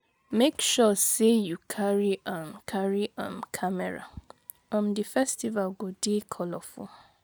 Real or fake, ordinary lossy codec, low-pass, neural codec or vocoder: real; none; none; none